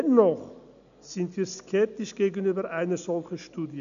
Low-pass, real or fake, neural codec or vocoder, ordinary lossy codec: 7.2 kHz; real; none; none